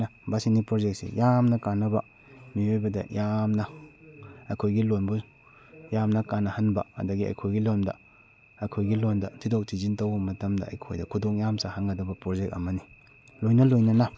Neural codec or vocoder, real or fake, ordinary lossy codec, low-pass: none; real; none; none